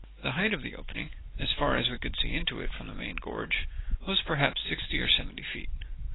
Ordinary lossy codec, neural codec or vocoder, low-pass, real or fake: AAC, 16 kbps; none; 7.2 kHz; real